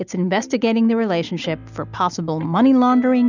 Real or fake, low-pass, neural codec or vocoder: real; 7.2 kHz; none